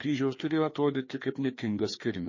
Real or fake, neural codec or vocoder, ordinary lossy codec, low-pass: fake; codec, 16 kHz, 2 kbps, FreqCodec, larger model; MP3, 32 kbps; 7.2 kHz